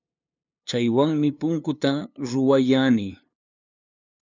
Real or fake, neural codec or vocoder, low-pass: fake; codec, 16 kHz, 2 kbps, FunCodec, trained on LibriTTS, 25 frames a second; 7.2 kHz